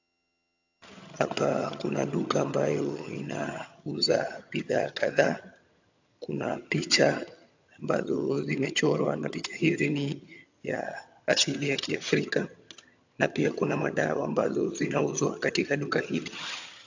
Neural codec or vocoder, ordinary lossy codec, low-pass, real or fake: vocoder, 22.05 kHz, 80 mel bands, HiFi-GAN; AAC, 48 kbps; 7.2 kHz; fake